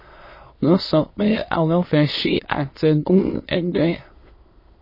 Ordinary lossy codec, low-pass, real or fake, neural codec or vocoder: MP3, 24 kbps; 5.4 kHz; fake; autoencoder, 22.05 kHz, a latent of 192 numbers a frame, VITS, trained on many speakers